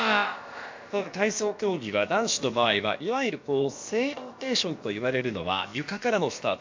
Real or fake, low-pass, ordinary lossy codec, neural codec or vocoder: fake; 7.2 kHz; MP3, 48 kbps; codec, 16 kHz, about 1 kbps, DyCAST, with the encoder's durations